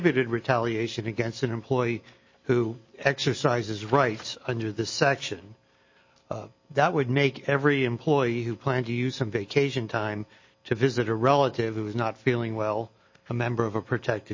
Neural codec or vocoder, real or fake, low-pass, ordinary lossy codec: none; real; 7.2 kHz; MP3, 32 kbps